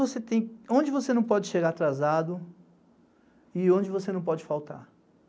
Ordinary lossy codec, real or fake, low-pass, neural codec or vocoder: none; real; none; none